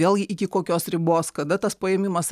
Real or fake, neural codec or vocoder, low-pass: real; none; 14.4 kHz